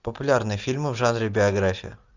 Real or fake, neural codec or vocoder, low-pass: real; none; 7.2 kHz